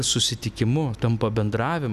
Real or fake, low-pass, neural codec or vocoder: real; 14.4 kHz; none